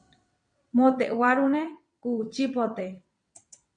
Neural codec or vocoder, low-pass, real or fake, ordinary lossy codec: vocoder, 22.05 kHz, 80 mel bands, WaveNeXt; 9.9 kHz; fake; MP3, 64 kbps